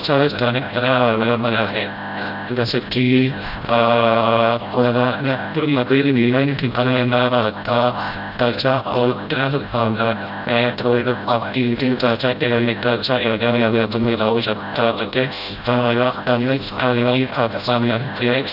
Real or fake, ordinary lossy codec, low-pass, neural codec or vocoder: fake; none; 5.4 kHz; codec, 16 kHz, 0.5 kbps, FreqCodec, smaller model